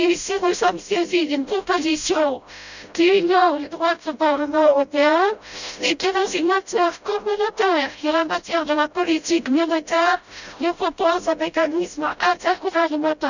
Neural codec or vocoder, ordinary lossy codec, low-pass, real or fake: codec, 16 kHz, 0.5 kbps, FreqCodec, smaller model; none; 7.2 kHz; fake